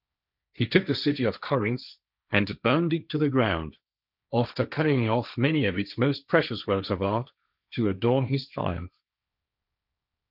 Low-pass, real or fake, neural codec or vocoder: 5.4 kHz; fake; codec, 16 kHz, 1.1 kbps, Voila-Tokenizer